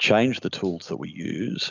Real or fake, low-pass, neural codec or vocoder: real; 7.2 kHz; none